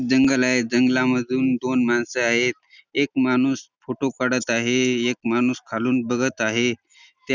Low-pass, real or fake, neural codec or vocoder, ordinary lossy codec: none; real; none; none